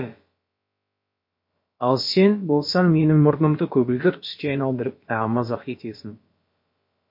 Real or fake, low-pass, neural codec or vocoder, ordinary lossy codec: fake; 5.4 kHz; codec, 16 kHz, about 1 kbps, DyCAST, with the encoder's durations; MP3, 32 kbps